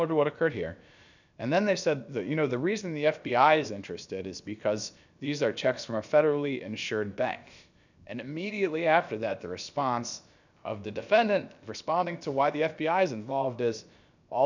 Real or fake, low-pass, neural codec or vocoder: fake; 7.2 kHz; codec, 16 kHz, about 1 kbps, DyCAST, with the encoder's durations